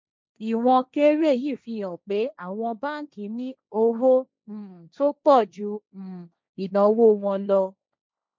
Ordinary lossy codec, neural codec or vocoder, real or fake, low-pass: none; codec, 16 kHz, 1.1 kbps, Voila-Tokenizer; fake; none